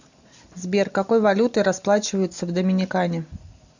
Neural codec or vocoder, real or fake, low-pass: none; real; 7.2 kHz